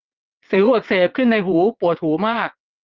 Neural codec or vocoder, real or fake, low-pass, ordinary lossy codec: vocoder, 22.05 kHz, 80 mel bands, WaveNeXt; fake; 7.2 kHz; Opus, 24 kbps